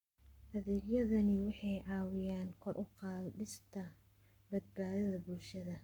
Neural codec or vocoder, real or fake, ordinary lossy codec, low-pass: codec, 44.1 kHz, 7.8 kbps, Pupu-Codec; fake; none; 19.8 kHz